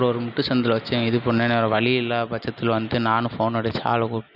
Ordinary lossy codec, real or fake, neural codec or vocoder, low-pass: none; real; none; 5.4 kHz